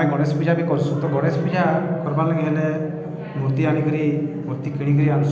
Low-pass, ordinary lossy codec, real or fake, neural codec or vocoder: none; none; real; none